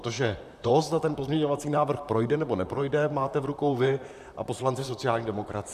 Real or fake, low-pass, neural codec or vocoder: fake; 14.4 kHz; vocoder, 44.1 kHz, 128 mel bands, Pupu-Vocoder